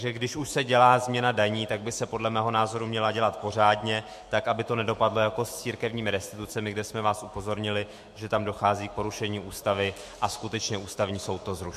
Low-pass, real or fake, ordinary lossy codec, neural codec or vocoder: 14.4 kHz; fake; MP3, 64 kbps; autoencoder, 48 kHz, 128 numbers a frame, DAC-VAE, trained on Japanese speech